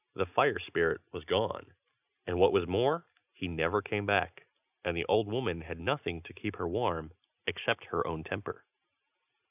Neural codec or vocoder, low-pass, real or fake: none; 3.6 kHz; real